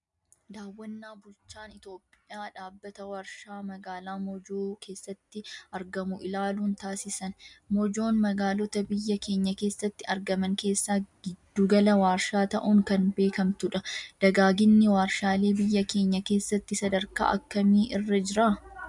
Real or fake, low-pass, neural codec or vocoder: real; 10.8 kHz; none